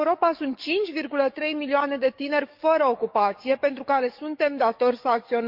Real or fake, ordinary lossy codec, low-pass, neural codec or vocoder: fake; Opus, 64 kbps; 5.4 kHz; codec, 44.1 kHz, 7.8 kbps, DAC